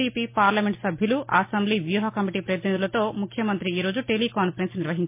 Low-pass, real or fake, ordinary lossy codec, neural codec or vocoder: 3.6 kHz; real; MP3, 24 kbps; none